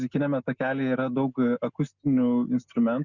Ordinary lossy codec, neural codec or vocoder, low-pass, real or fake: Opus, 64 kbps; none; 7.2 kHz; real